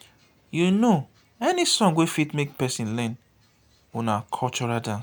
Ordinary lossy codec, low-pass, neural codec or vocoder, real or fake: none; none; none; real